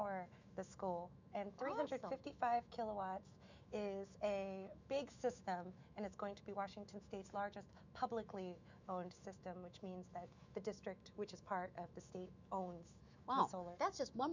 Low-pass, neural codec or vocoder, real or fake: 7.2 kHz; autoencoder, 48 kHz, 128 numbers a frame, DAC-VAE, trained on Japanese speech; fake